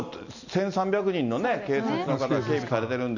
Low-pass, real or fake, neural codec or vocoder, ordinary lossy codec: 7.2 kHz; real; none; none